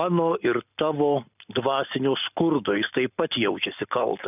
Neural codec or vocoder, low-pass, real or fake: none; 3.6 kHz; real